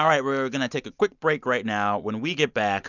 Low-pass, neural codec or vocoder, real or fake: 7.2 kHz; none; real